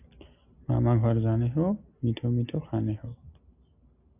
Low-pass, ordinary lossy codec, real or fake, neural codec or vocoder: 3.6 kHz; AAC, 32 kbps; real; none